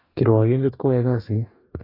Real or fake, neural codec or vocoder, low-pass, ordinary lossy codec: fake; codec, 44.1 kHz, 2.6 kbps, DAC; 5.4 kHz; AAC, 24 kbps